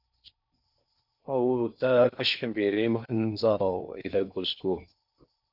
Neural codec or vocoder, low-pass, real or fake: codec, 16 kHz in and 24 kHz out, 0.6 kbps, FocalCodec, streaming, 4096 codes; 5.4 kHz; fake